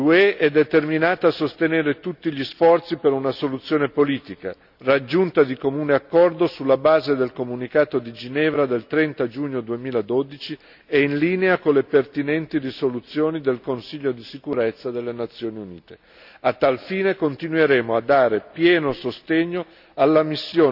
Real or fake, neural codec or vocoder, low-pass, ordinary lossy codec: real; none; 5.4 kHz; none